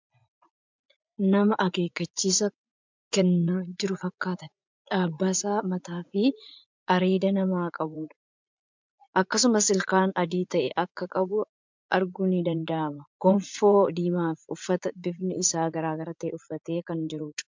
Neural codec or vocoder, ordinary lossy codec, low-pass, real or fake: vocoder, 44.1 kHz, 80 mel bands, Vocos; MP3, 64 kbps; 7.2 kHz; fake